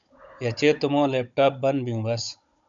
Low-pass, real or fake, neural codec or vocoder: 7.2 kHz; fake; codec, 16 kHz, 16 kbps, FunCodec, trained on Chinese and English, 50 frames a second